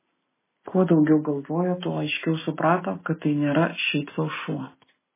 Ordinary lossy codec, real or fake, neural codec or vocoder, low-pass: MP3, 16 kbps; real; none; 3.6 kHz